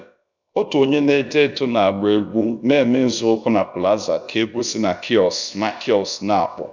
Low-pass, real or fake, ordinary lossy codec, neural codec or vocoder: 7.2 kHz; fake; none; codec, 16 kHz, about 1 kbps, DyCAST, with the encoder's durations